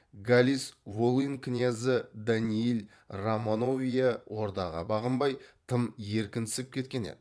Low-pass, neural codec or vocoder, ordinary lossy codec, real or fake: none; vocoder, 22.05 kHz, 80 mel bands, Vocos; none; fake